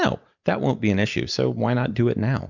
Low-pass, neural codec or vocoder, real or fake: 7.2 kHz; none; real